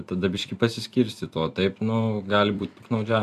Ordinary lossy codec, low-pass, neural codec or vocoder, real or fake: MP3, 96 kbps; 14.4 kHz; vocoder, 44.1 kHz, 128 mel bands every 256 samples, BigVGAN v2; fake